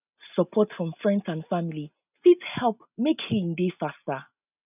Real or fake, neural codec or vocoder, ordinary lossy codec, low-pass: real; none; none; 3.6 kHz